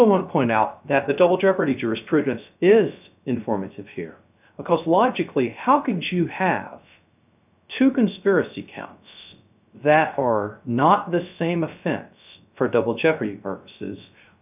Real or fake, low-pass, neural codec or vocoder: fake; 3.6 kHz; codec, 16 kHz, 0.3 kbps, FocalCodec